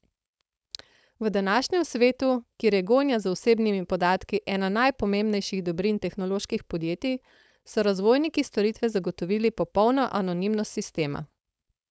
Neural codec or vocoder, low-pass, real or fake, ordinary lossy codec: codec, 16 kHz, 4.8 kbps, FACodec; none; fake; none